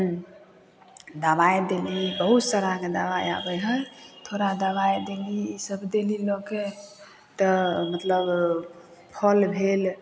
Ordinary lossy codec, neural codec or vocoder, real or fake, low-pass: none; none; real; none